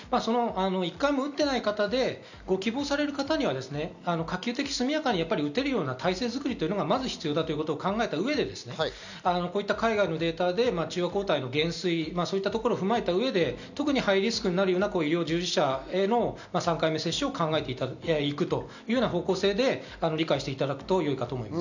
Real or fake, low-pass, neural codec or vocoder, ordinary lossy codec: real; 7.2 kHz; none; none